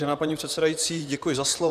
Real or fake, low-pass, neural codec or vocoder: fake; 14.4 kHz; vocoder, 44.1 kHz, 128 mel bands, Pupu-Vocoder